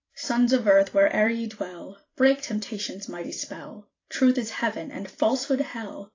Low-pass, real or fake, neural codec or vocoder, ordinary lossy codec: 7.2 kHz; fake; vocoder, 44.1 kHz, 128 mel bands every 256 samples, BigVGAN v2; AAC, 32 kbps